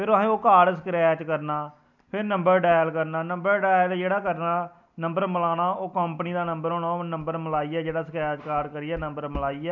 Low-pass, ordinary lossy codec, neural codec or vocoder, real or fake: 7.2 kHz; none; none; real